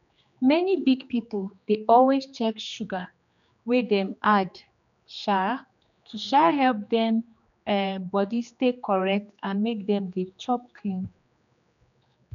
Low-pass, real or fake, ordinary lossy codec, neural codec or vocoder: 7.2 kHz; fake; none; codec, 16 kHz, 2 kbps, X-Codec, HuBERT features, trained on general audio